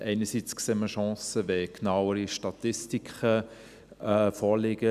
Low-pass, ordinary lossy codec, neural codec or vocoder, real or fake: 14.4 kHz; none; none; real